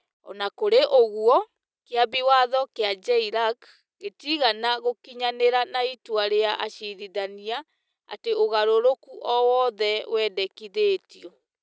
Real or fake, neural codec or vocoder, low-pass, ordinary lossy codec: real; none; none; none